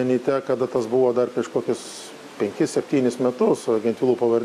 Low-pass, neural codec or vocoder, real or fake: 14.4 kHz; none; real